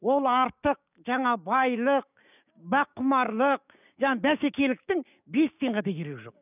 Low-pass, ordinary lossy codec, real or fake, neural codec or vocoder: 3.6 kHz; none; fake; codec, 16 kHz, 6 kbps, DAC